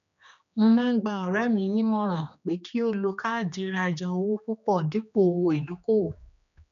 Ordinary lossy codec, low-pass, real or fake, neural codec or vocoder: none; 7.2 kHz; fake; codec, 16 kHz, 2 kbps, X-Codec, HuBERT features, trained on general audio